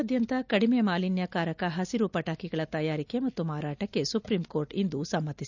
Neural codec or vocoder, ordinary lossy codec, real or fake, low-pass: none; none; real; 7.2 kHz